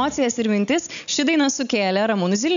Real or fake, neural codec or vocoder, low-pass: real; none; 7.2 kHz